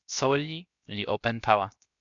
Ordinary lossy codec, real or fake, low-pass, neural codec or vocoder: MP3, 64 kbps; fake; 7.2 kHz; codec, 16 kHz, 0.7 kbps, FocalCodec